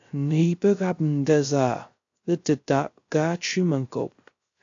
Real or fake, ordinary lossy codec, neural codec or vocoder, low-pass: fake; AAC, 48 kbps; codec, 16 kHz, 0.3 kbps, FocalCodec; 7.2 kHz